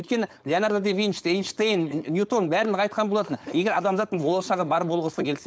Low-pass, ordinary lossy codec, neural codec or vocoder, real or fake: none; none; codec, 16 kHz, 4.8 kbps, FACodec; fake